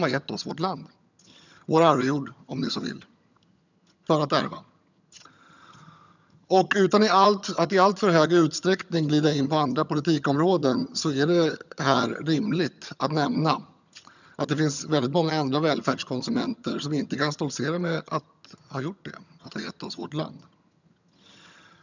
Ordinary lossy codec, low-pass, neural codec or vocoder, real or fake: none; 7.2 kHz; vocoder, 22.05 kHz, 80 mel bands, HiFi-GAN; fake